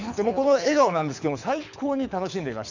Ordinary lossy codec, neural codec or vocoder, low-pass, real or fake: none; codec, 24 kHz, 6 kbps, HILCodec; 7.2 kHz; fake